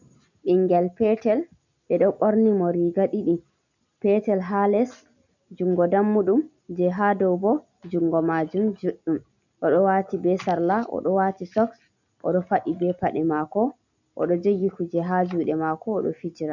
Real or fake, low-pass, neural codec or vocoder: real; 7.2 kHz; none